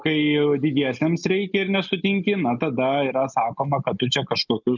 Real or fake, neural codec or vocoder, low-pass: real; none; 7.2 kHz